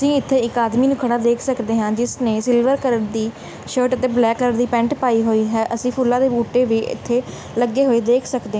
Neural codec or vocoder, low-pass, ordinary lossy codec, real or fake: none; none; none; real